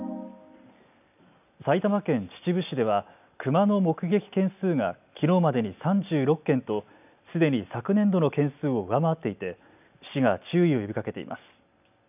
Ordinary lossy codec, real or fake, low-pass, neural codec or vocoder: none; real; 3.6 kHz; none